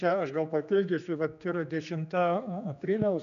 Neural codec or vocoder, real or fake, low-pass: codec, 16 kHz, 2 kbps, X-Codec, HuBERT features, trained on general audio; fake; 7.2 kHz